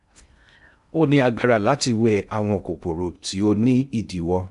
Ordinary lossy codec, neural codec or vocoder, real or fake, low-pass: none; codec, 16 kHz in and 24 kHz out, 0.6 kbps, FocalCodec, streaming, 2048 codes; fake; 10.8 kHz